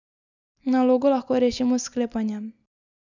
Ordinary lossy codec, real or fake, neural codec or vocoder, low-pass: none; real; none; 7.2 kHz